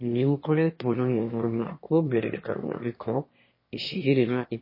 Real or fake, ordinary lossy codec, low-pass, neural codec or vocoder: fake; MP3, 24 kbps; 5.4 kHz; autoencoder, 22.05 kHz, a latent of 192 numbers a frame, VITS, trained on one speaker